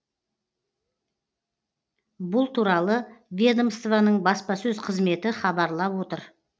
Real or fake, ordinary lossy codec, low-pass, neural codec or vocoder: real; none; none; none